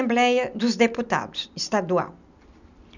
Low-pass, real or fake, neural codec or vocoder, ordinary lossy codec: 7.2 kHz; real; none; none